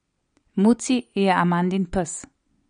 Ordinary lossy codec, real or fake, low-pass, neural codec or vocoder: MP3, 48 kbps; real; 9.9 kHz; none